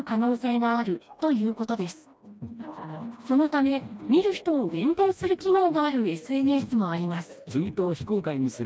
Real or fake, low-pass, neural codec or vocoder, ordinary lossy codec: fake; none; codec, 16 kHz, 1 kbps, FreqCodec, smaller model; none